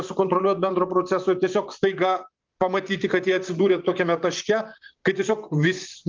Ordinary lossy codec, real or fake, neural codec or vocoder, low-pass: Opus, 32 kbps; fake; vocoder, 44.1 kHz, 128 mel bands, Pupu-Vocoder; 7.2 kHz